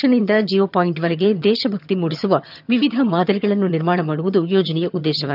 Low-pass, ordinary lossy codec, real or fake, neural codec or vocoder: 5.4 kHz; none; fake; vocoder, 22.05 kHz, 80 mel bands, HiFi-GAN